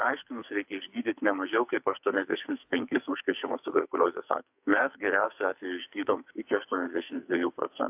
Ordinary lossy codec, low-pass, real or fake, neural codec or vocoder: AAC, 32 kbps; 3.6 kHz; fake; codec, 44.1 kHz, 2.6 kbps, SNAC